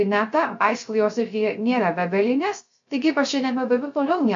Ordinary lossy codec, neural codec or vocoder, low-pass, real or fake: MP3, 48 kbps; codec, 16 kHz, 0.3 kbps, FocalCodec; 7.2 kHz; fake